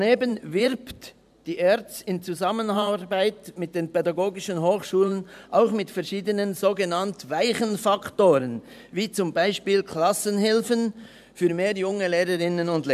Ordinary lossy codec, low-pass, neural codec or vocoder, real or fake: none; 14.4 kHz; vocoder, 44.1 kHz, 128 mel bands every 512 samples, BigVGAN v2; fake